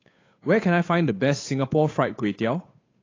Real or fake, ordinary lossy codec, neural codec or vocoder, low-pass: fake; AAC, 32 kbps; codec, 16 kHz, 8 kbps, FunCodec, trained on Chinese and English, 25 frames a second; 7.2 kHz